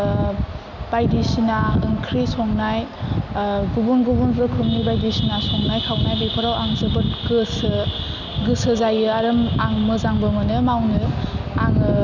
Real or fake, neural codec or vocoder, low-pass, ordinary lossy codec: real; none; 7.2 kHz; none